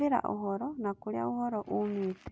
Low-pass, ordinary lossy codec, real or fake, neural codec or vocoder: none; none; real; none